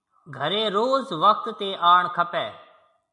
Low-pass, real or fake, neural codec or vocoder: 10.8 kHz; real; none